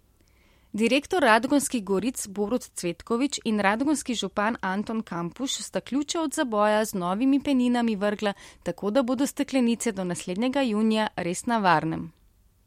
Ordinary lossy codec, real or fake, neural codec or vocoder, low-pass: MP3, 64 kbps; real; none; 19.8 kHz